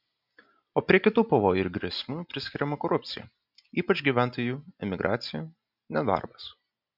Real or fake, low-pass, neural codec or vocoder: real; 5.4 kHz; none